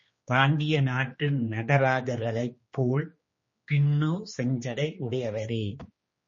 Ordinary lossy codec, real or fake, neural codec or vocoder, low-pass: MP3, 32 kbps; fake; codec, 16 kHz, 2 kbps, X-Codec, HuBERT features, trained on general audio; 7.2 kHz